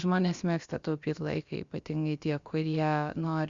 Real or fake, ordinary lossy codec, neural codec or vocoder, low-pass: fake; Opus, 64 kbps; codec, 16 kHz, about 1 kbps, DyCAST, with the encoder's durations; 7.2 kHz